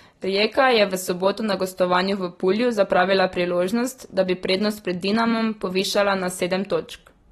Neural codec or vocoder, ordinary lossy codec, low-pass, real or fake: none; AAC, 32 kbps; 19.8 kHz; real